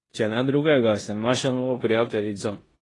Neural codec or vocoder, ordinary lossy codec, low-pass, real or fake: codec, 16 kHz in and 24 kHz out, 0.9 kbps, LongCat-Audio-Codec, four codebook decoder; AAC, 32 kbps; 10.8 kHz; fake